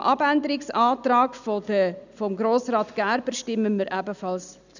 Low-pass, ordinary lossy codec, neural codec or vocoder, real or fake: 7.2 kHz; none; none; real